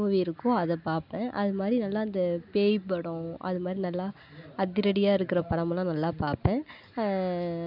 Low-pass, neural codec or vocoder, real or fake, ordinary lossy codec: 5.4 kHz; none; real; none